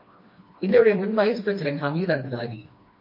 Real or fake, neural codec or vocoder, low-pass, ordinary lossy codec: fake; codec, 16 kHz, 2 kbps, FreqCodec, smaller model; 5.4 kHz; MP3, 32 kbps